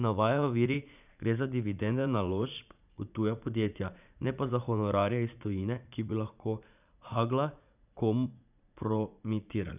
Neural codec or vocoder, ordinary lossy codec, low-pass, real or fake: vocoder, 44.1 kHz, 80 mel bands, Vocos; none; 3.6 kHz; fake